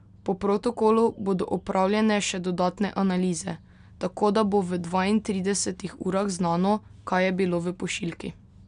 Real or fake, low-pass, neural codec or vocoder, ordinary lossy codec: real; 9.9 kHz; none; none